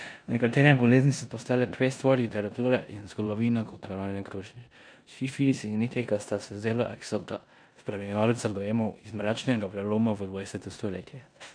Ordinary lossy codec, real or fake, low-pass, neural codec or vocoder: none; fake; 9.9 kHz; codec, 16 kHz in and 24 kHz out, 0.9 kbps, LongCat-Audio-Codec, four codebook decoder